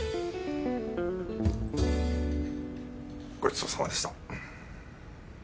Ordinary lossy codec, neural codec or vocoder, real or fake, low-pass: none; none; real; none